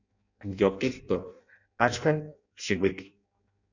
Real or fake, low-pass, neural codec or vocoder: fake; 7.2 kHz; codec, 16 kHz in and 24 kHz out, 0.6 kbps, FireRedTTS-2 codec